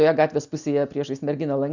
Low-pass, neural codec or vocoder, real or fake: 7.2 kHz; none; real